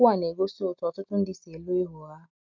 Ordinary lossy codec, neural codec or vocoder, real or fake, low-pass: MP3, 64 kbps; none; real; 7.2 kHz